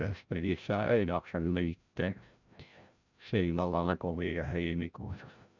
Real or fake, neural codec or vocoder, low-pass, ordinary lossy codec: fake; codec, 16 kHz, 0.5 kbps, FreqCodec, larger model; 7.2 kHz; none